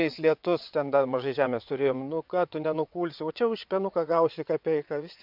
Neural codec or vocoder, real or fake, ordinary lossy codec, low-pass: vocoder, 22.05 kHz, 80 mel bands, WaveNeXt; fake; MP3, 48 kbps; 5.4 kHz